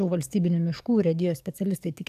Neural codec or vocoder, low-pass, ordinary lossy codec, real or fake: codec, 44.1 kHz, 7.8 kbps, DAC; 14.4 kHz; AAC, 96 kbps; fake